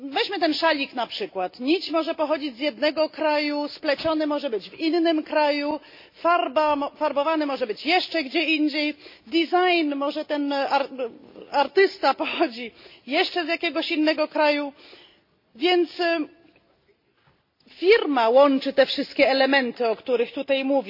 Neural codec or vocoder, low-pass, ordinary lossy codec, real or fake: none; 5.4 kHz; MP3, 32 kbps; real